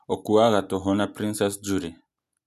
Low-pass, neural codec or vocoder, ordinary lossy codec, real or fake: 14.4 kHz; none; none; real